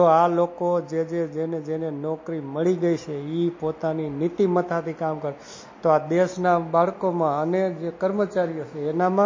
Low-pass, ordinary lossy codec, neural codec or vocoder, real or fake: 7.2 kHz; MP3, 32 kbps; none; real